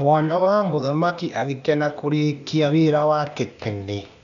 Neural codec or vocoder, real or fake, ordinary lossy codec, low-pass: codec, 16 kHz, 0.8 kbps, ZipCodec; fake; none; 7.2 kHz